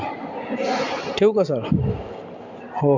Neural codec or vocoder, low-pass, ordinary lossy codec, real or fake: none; 7.2 kHz; MP3, 48 kbps; real